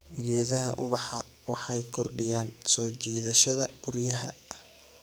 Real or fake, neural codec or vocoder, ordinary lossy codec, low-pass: fake; codec, 44.1 kHz, 2.6 kbps, SNAC; none; none